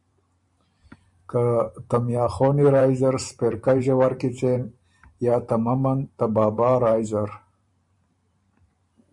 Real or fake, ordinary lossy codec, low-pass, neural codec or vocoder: fake; MP3, 64 kbps; 10.8 kHz; vocoder, 44.1 kHz, 128 mel bands every 512 samples, BigVGAN v2